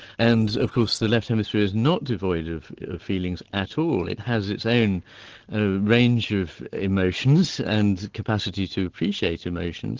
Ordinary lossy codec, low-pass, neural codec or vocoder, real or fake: Opus, 16 kbps; 7.2 kHz; none; real